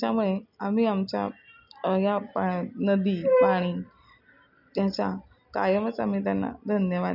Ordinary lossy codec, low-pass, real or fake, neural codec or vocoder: none; 5.4 kHz; real; none